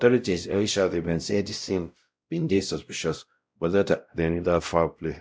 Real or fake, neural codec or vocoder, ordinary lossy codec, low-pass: fake; codec, 16 kHz, 0.5 kbps, X-Codec, WavLM features, trained on Multilingual LibriSpeech; none; none